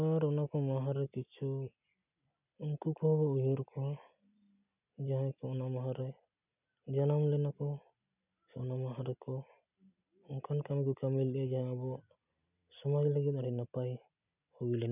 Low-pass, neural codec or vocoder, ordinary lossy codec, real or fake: 3.6 kHz; none; none; real